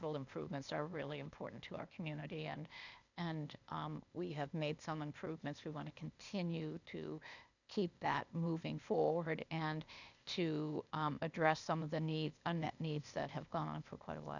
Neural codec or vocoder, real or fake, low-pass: codec, 16 kHz, 0.8 kbps, ZipCodec; fake; 7.2 kHz